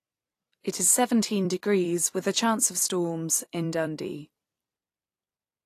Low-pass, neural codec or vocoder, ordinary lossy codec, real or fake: 14.4 kHz; vocoder, 44.1 kHz, 128 mel bands every 256 samples, BigVGAN v2; AAC, 48 kbps; fake